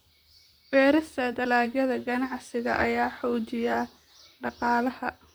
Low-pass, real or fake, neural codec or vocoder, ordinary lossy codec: none; fake; vocoder, 44.1 kHz, 128 mel bands, Pupu-Vocoder; none